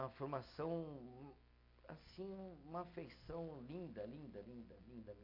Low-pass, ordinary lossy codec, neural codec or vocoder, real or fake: 5.4 kHz; AAC, 48 kbps; none; real